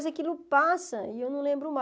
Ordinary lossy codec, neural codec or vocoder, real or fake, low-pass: none; none; real; none